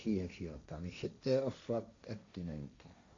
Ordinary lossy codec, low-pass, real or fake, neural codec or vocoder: AAC, 48 kbps; 7.2 kHz; fake; codec, 16 kHz, 1.1 kbps, Voila-Tokenizer